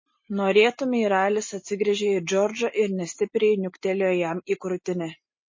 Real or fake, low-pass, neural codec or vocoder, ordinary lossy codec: real; 7.2 kHz; none; MP3, 32 kbps